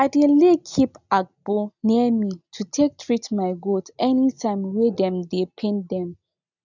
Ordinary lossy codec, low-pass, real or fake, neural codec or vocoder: none; 7.2 kHz; real; none